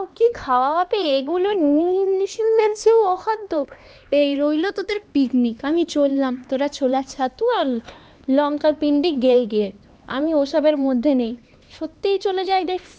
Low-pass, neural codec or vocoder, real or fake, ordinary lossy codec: none; codec, 16 kHz, 2 kbps, X-Codec, HuBERT features, trained on LibriSpeech; fake; none